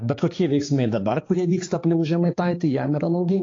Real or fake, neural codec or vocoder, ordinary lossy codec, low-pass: fake; codec, 16 kHz, 4 kbps, X-Codec, HuBERT features, trained on balanced general audio; AAC, 32 kbps; 7.2 kHz